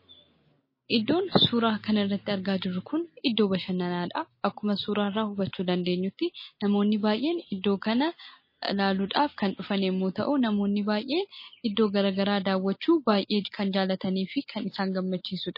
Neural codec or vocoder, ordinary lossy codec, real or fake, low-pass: none; MP3, 24 kbps; real; 5.4 kHz